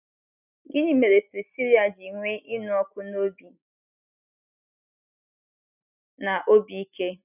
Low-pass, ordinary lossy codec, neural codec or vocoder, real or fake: 3.6 kHz; none; none; real